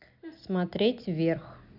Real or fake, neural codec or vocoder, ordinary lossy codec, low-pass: real; none; none; 5.4 kHz